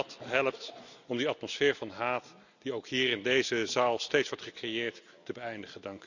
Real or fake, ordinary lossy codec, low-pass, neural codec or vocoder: real; none; 7.2 kHz; none